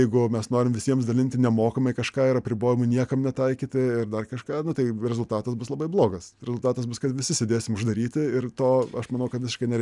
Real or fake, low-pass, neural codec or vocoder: real; 10.8 kHz; none